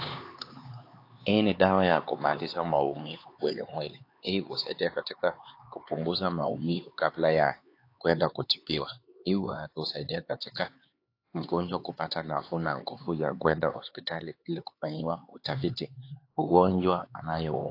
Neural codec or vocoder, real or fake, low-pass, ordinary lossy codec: codec, 16 kHz, 4 kbps, X-Codec, HuBERT features, trained on LibriSpeech; fake; 5.4 kHz; AAC, 32 kbps